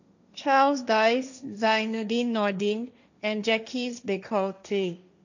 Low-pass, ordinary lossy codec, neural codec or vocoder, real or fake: 7.2 kHz; none; codec, 16 kHz, 1.1 kbps, Voila-Tokenizer; fake